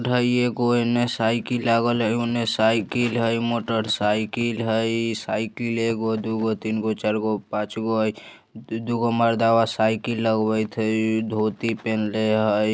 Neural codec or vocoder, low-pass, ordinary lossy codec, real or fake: none; none; none; real